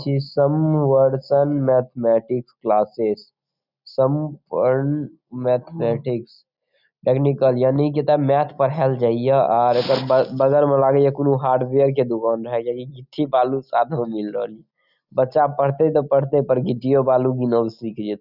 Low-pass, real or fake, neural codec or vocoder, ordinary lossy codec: 5.4 kHz; real; none; none